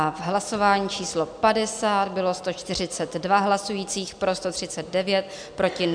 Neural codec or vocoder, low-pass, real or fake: none; 9.9 kHz; real